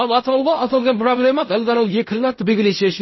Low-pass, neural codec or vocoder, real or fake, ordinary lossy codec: 7.2 kHz; codec, 16 kHz in and 24 kHz out, 0.4 kbps, LongCat-Audio-Codec, fine tuned four codebook decoder; fake; MP3, 24 kbps